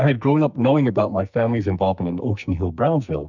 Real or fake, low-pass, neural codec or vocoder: fake; 7.2 kHz; codec, 44.1 kHz, 2.6 kbps, SNAC